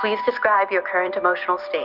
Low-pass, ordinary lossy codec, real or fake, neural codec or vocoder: 5.4 kHz; Opus, 24 kbps; real; none